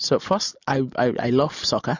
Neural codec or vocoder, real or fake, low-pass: none; real; 7.2 kHz